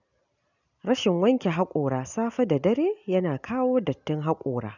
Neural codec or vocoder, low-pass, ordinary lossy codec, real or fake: none; 7.2 kHz; none; real